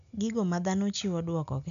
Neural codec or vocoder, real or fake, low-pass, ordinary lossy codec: none; real; 7.2 kHz; none